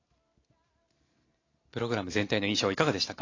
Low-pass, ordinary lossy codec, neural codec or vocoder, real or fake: 7.2 kHz; AAC, 32 kbps; none; real